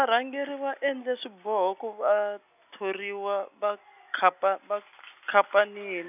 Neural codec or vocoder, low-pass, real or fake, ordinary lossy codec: none; 3.6 kHz; real; none